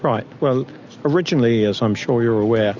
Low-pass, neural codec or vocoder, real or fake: 7.2 kHz; none; real